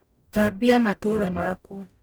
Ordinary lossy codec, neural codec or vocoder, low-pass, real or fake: none; codec, 44.1 kHz, 0.9 kbps, DAC; none; fake